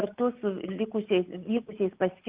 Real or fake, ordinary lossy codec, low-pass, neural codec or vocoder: real; Opus, 32 kbps; 3.6 kHz; none